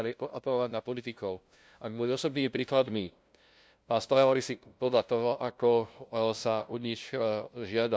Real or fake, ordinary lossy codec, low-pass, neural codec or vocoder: fake; none; none; codec, 16 kHz, 0.5 kbps, FunCodec, trained on LibriTTS, 25 frames a second